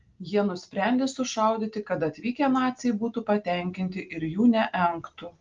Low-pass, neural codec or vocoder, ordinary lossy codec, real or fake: 7.2 kHz; none; Opus, 32 kbps; real